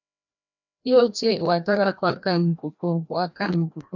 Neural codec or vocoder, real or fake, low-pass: codec, 16 kHz, 1 kbps, FreqCodec, larger model; fake; 7.2 kHz